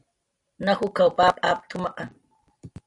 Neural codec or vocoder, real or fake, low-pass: none; real; 10.8 kHz